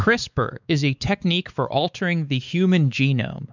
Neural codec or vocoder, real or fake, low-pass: codec, 16 kHz, 4 kbps, X-Codec, WavLM features, trained on Multilingual LibriSpeech; fake; 7.2 kHz